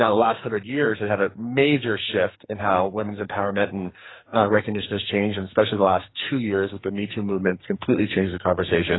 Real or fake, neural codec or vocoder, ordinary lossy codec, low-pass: fake; codec, 44.1 kHz, 2.6 kbps, SNAC; AAC, 16 kbps; 7.2 kHz